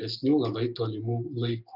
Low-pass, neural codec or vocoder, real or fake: 5.4 kHz; none; real